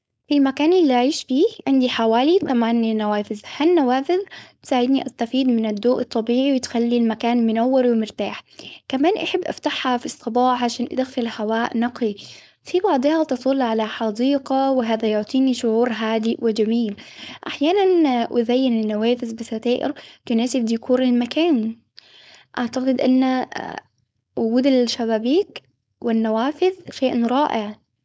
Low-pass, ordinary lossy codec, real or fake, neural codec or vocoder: none; none; fake; codec, 16 kHz, 4.8 kbps, FACodec